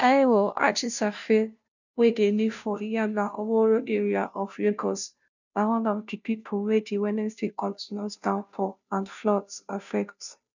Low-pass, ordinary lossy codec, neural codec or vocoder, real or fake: 7.2 kHz; none; codec, 16 kHz, 0.5 kbps, FunCodec, trained on Chinese and English, 25 frames a second; fake